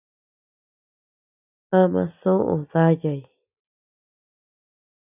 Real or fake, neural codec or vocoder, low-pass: real; none; 3.6 kHz